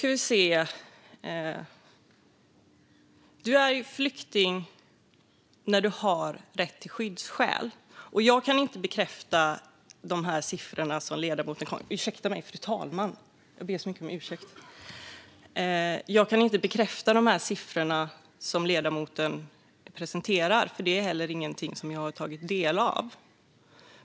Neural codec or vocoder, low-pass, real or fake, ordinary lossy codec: none; none; real; none